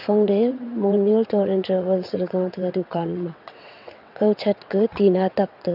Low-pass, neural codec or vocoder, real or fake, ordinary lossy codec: 5.4 kHz; vocoder, 22.05 kHz, 80 mel bands, WaveNeXt; fake; none